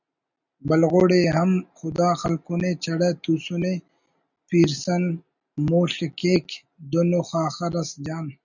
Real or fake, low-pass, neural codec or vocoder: real; 7.2 kHz; none